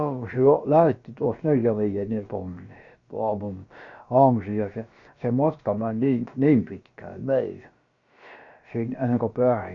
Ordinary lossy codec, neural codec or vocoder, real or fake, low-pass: Opus, 64 kbps; codec, 16 kHz, about 1 kbps, DyCAST, with the encoder's durations; fake; 7.2 kHz